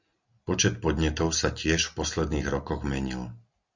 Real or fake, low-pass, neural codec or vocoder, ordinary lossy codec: real; 7.2 kHz; none; Opus, 64 kbps